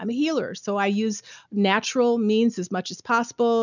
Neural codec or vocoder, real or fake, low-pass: none; real; 7.2 kHz